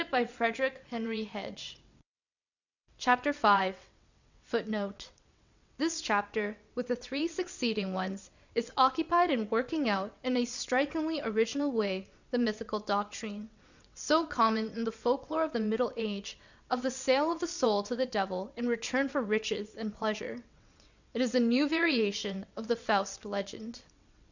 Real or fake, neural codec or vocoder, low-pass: fake; vocoder, 22.05 kHz, 80 mel bands, WaveNeXt; 7.2 kHz